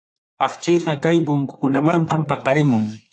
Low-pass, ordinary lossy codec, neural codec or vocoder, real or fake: 9.9 kHz; AAC, 64 kbps; codec, 24 kHz, 1 kbps, SNAC; fake